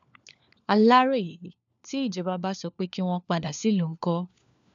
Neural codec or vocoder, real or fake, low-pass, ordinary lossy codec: codec, 16 kHz, 4 kbps, FunCodec, trained on LibriTTS, 50 frames a second; fake; 7.2 kHz; none